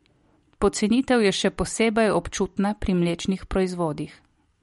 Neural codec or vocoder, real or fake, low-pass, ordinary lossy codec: none; real; 19.8 kHz; MP3, 48 kbps